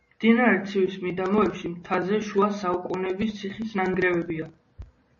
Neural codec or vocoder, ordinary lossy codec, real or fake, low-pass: none; MP3, 32 kbps; real; 7.2 kHz